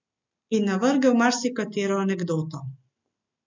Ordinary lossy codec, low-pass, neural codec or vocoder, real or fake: MP3, 64 kbps; 7.2 kHz; none; real